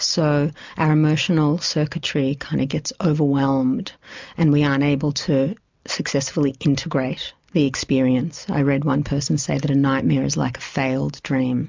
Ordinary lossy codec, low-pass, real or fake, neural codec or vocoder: MP3, 64 kbps; 7.2 kHz; real; none